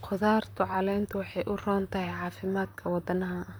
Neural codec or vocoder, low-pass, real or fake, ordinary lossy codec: vocoder, 44.1 kHz, 128 mel bands, Pupu-Vocoder; none; fake; none